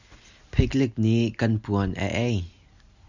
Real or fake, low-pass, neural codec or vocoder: real; 7.2 kHz; none